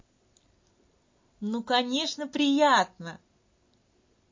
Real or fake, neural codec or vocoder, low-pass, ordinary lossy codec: real; none; 7.2 kHz; MP3, 32 kbps